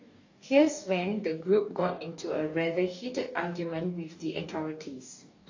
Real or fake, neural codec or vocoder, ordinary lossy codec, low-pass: fake; codec, 44.1 kHz, 2.6 kbps, DAC; AAC, 48 kbps; 7.2 kHz